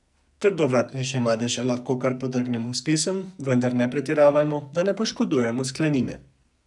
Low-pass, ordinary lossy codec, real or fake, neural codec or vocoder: 10.8 kHz; none; fake; codec, 44.1 kHz, 2.6 kbps, SNAC